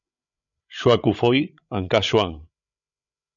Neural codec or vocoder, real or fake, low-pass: codec, 16 kHz, 8 kbps, FreqCodec, larger model; fake; 7.2 kHz